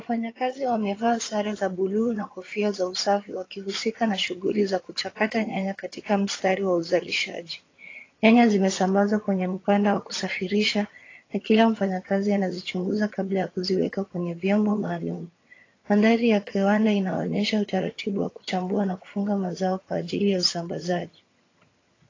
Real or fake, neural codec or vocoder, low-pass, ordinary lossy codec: fake; vocoder, 22.05 kHz, 80 mel bands, HiFi-GAN; 7.2 kHz; AAC, 32 kbps